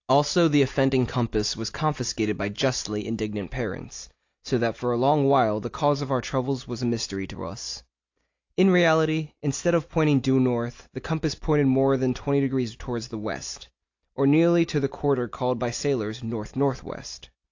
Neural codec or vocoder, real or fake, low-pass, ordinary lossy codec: none; real; 7.2 kHz; AAC, 48 kbps